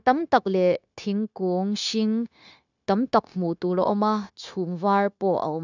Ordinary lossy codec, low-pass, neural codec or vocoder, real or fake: none; 7.2 kHz; codec, 16 kHz, 0.9 kbps, LongCat-Audio-Codec; fake